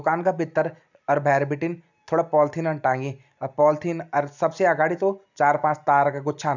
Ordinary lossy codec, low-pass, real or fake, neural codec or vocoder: none; 7.2 kHz; real; none